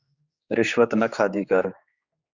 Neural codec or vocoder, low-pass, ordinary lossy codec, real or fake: codec, 16 kHz, 4 kbps, X-Codec, HuBERT features, trained on general audio; 7.2 kHz; Opus, 64 kbps; fake